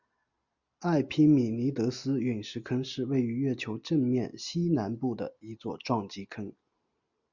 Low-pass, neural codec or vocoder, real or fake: 7.2 kHz; none; real